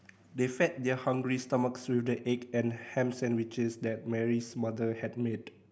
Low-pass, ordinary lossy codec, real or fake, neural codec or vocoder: none; none; real; none